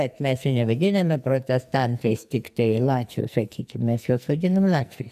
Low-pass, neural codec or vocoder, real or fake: 14.4 kHz; codec, 44.1 kHz, 2.6 kbps, SNAC; fake